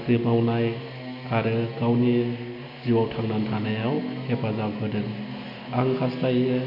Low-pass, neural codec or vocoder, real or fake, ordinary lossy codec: 5.4 kHz; none; real; none